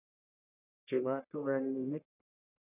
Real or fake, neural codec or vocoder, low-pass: fake; codec, 44.1 kHz, 1.7 kbps, Pupu-Codec; 3.6 kHz